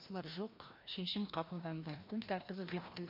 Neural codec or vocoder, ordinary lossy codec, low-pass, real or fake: codec, 16 kHz, 1 kbps, FreqCodec, larger model; MP3, 32 kbps; 5.4 kHz; fake